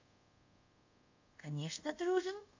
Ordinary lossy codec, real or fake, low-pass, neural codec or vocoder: AAC, 48 kbps; fake; 7.2 kHz; codec, 24 kHz, 0.5 kbps, DualCodec